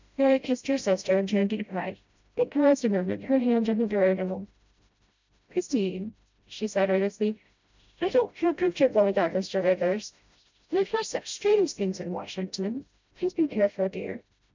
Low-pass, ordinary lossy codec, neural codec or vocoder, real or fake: 7.2 kHz; MP3, 64 kbps; codec, 16 kHz, 0.5 kbps, FreqCodec, smaller model; fake